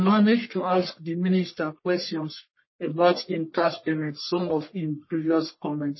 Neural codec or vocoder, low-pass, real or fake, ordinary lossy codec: codec, 44.1 kHz, 1.7 kbps, Pupu-Codec; 7.2 kHz; fake; MP3, 24 kbps